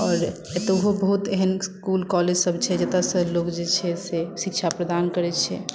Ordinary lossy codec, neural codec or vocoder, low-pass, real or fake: none; none; none; real